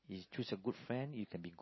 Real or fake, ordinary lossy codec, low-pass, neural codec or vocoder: real; MP3, 24 kbps; 7.2 kHz; none